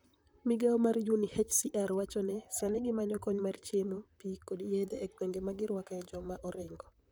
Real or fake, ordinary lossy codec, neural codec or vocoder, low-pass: fake; none; vocoder, 44.1 kHz, 128 mel bands, Pupu-Vocoder; none